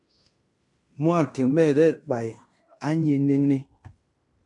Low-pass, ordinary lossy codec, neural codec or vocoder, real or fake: 10.8 kHz; MP3, 64 kbps; codec, 16 kHz in and 24 kHz out, 0.9 kbps, LongCat-Audio-Codec, fine tuned four codebook decoder; fake